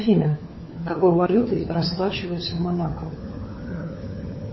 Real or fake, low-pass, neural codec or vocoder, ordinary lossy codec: fake; 7.2 kHz; codec, 16 kHz, 4 kbps, FunCodec, trained on LibriTTS, 50 frames a second; MP3, 24 kbps